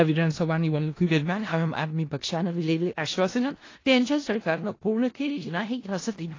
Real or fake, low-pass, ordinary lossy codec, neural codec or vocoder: fake; 7.2 kHz; AAC, 32 kbps; codec, 16 kHz in and 24 kHz out, 0.4 kbps, LongCat-Audio-Codec, four codebook decoder